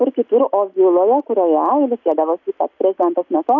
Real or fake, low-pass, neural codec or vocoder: real; 7.2 kHz; none